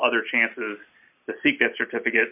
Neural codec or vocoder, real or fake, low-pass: none; real; 3.6 kHz